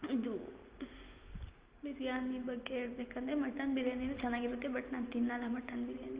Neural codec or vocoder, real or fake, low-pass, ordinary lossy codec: none; real; 3.6 kHz; Opus, 32 kbps